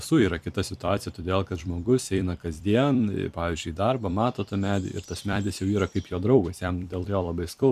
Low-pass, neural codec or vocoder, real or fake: 14.4 kHz; vocoder, 44.1 kHz, 128 mel bands every 256 samples, BigVGAN v2; fake